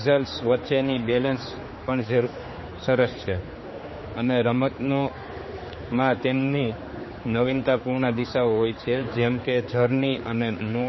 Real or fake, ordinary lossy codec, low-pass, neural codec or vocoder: fake; MP3, 24 kbps; 7.2 kHz; codec, 16 kHz, 4 kbps, X-Codec, HuBERT features, trained on general audio